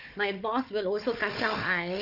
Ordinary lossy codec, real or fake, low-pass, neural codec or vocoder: none; fake; 5.4 kHz; codec, 16 kHz, 4 kbps, FunCodec, trained on Chinese and English, 50 frames a second